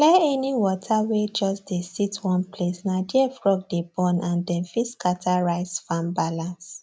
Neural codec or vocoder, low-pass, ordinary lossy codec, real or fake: none; none; none; real